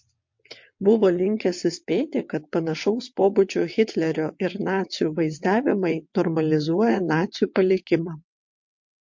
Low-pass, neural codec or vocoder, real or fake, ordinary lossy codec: 7.2 kHz; vocoder, 22.05 kHz, 80 mel bands, WaveNeXt; fake; MP3, 48 kbps